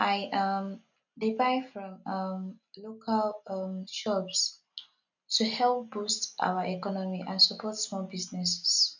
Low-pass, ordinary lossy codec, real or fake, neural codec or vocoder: 7.2 kHz; none; real; none